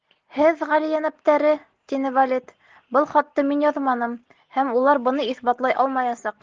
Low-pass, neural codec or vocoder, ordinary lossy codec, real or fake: 7.2 kHz; none; Opus, 16 kbps; real